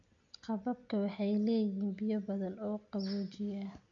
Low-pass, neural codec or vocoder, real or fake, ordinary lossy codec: 7.2 kHz; none; real; MP3, 64 kbps